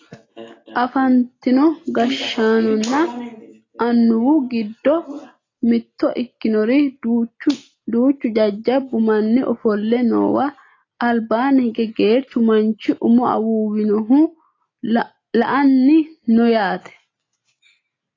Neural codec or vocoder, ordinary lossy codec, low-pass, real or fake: none; AAC, 32 kbps; 7.2 kHz; real